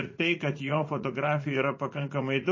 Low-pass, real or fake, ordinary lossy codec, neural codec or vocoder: 7.2 kHz; fake; MP3, 32 kbps; vocoder, 44.1 kHz, 80 mel bands, Vocos